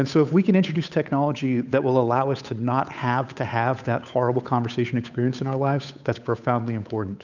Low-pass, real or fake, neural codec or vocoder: 7.2 kHz; fake; codec, 16 kHz, 8 kbps, FunCodec, trained on Chinese and English, 25 frames a second